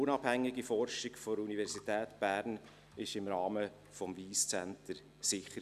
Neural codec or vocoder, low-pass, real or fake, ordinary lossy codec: none; 14.4 kHz; real; none